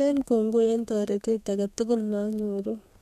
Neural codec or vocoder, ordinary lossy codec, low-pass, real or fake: codec, 32 kHz, 1.9 kbps, SNAC; none; 14.4 kHz; fake